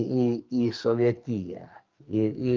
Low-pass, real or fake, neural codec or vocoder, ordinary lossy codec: 7.2 kHz; fake; codec, 44.1 kHz, 2.6 kbps, SNAC; Opus, 24 kbps